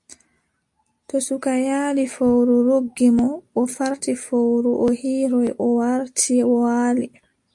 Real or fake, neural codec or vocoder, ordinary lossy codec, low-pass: real; none; AAC, 64 kbps; 10.8 kHz